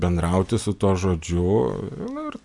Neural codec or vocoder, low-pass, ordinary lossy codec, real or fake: none; 10.8 kHz; MP3, 96 kbps; real